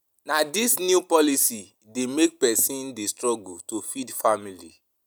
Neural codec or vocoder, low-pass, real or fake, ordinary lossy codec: none; none; real; none